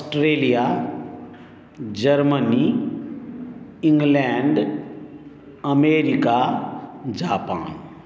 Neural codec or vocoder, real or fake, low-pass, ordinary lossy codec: none; real; none; none